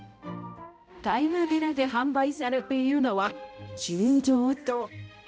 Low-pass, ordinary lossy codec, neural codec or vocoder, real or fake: none; none; codec, 16 kHz, 0.5 kbps, X-Codec, HuBERT features, trained on balanced general audio; fake